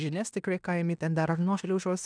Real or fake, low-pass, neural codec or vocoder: fake; 9.9 kHz; codec, 16 kHz in and 24 kHz out, 0.9 kbps, LongCat-Audio-Codec, fine tuned four codebook decoder